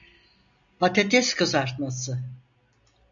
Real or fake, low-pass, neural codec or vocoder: real; 7.2 kHz; none